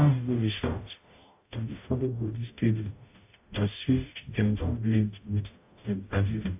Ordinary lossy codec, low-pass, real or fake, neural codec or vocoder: AAC, 32 kbps; 3.6 kHz; fake; codec, 44.1 kHz, 0.9 kbps, DAC